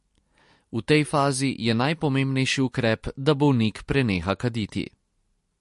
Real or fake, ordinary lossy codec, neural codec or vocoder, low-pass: real; MP3, 48 kbps; none; 14.4 kHz